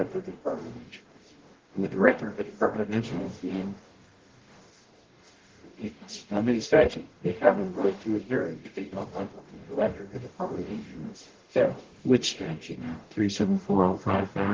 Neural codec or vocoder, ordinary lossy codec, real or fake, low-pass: codec, 44.1 kHz, 0.9 kbps, DAC; Opus, 16 kbps; fake; 7.2 kHz